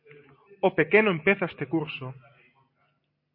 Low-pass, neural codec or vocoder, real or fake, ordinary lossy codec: 5.4 kHz; none; real; MP3, 32 kbps